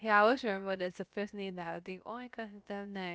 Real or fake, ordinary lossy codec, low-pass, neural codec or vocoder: fake; none; none; codec, 16 kHz, about 1 kbps, DyCAST, with the encoder's durations